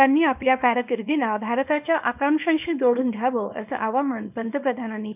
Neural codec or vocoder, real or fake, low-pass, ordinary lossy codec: codec, 24 kHz, 0.9 kbps, WavTokenizer, small release; fake; 3.6 kHz; none